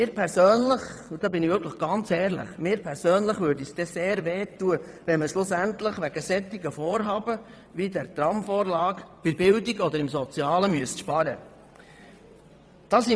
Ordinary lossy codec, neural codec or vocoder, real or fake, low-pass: none; vocoder, 22.05 kHz, 80 mel bands, WaveNeXt; fake; none